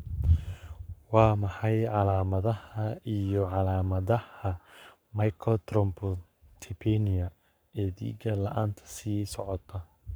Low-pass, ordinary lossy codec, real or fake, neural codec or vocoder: none; none; fake; codec, 44.1 kHz, 7.8 kbps, Pupu-Codec